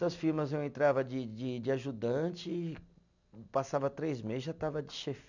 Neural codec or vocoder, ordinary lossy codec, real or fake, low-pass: none; none; real; 7.2 kHz